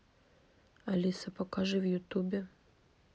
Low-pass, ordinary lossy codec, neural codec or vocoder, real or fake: none; none; none; real